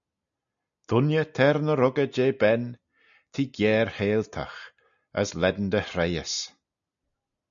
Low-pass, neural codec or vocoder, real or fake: 7.2 kHz; none; real